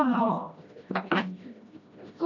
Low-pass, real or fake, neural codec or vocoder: 7.2 kHz; fake; codec, 16 kHz, 1 kbps, FreqCodec, smaller model